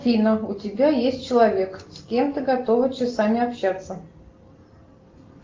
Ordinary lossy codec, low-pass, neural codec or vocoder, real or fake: Opus, 32 kbps; 7.2 kHz; none; real